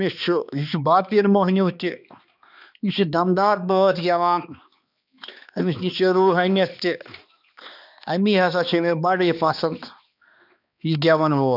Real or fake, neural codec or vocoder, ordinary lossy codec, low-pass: fake; codec, 16 kHz, 2 kbps, X-Codec, HuBERT features, trained on balanced general audio; none; 5.4 kHz